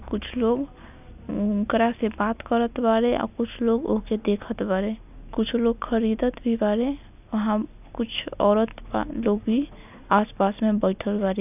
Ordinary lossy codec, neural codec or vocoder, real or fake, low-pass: none; none; real; 3.6 kHz